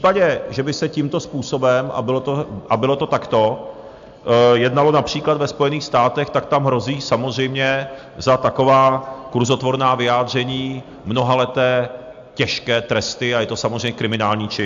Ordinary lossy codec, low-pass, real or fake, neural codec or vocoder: AAC, 64 kbps; 7.2 kHz; real; none